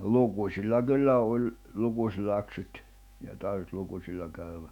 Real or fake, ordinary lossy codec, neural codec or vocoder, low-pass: fake; none; autoencoder, 48 kHz, 128 numbers a frame, DAC-VAE, trained on Japanese speech; 19.8 kHz